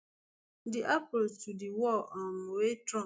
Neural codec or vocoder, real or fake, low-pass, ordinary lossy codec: none; real; none; none